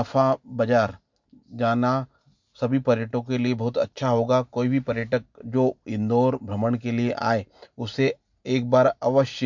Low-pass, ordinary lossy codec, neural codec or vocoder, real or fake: 7.2 kHz; MP3, 64 kbps; none; real